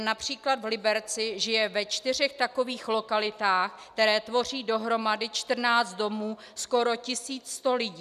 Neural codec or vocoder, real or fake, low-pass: none; real; 14.4 kHz